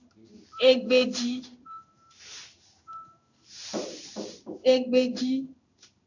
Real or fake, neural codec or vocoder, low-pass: fake; codec, 16 kHz in and 24 kHz out, 1 kbps, XY-Tokenizer; 7.2 kHz